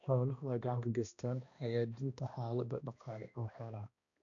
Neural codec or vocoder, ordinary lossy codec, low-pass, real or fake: codec, 16 kHz, 1 kbps, X-Codec, HuBERT features, trained on balanced general audio; none; 7.2 kHz; fake